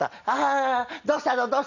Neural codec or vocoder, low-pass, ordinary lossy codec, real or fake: none; 7.2 kHz; none; real